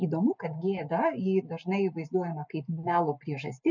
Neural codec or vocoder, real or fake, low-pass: none; real; 7.2 kHz